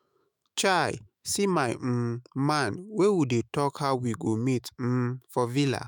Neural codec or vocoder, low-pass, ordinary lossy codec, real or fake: autoencoder, 48 kHz, 128 numbers a frame, DAC-VAE, trained on Japanese speech; none; none; fake